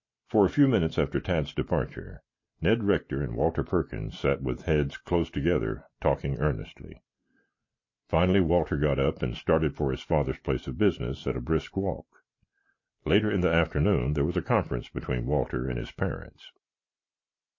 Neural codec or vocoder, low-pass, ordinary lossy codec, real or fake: none; 7.2 kHz; MP3, 32 kbps; real